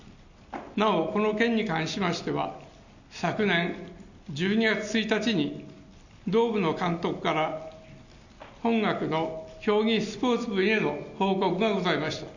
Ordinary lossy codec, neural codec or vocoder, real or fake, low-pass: none; none; real; 7.2 kHz